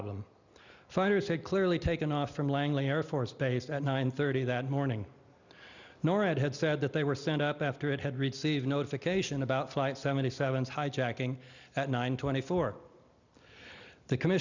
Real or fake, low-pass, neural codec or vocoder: real; 7.2 kHz; none